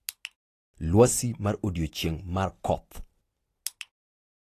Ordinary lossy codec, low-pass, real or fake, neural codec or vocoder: AAC, 48 kbps; 14.4 kHz; real; none